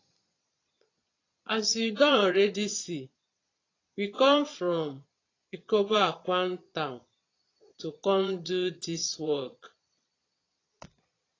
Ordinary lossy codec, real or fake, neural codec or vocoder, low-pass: AAC, 32 kbps; fake; vocoder, 22.05 kHz, 80 mel bands, Vocos; 7.2 kHz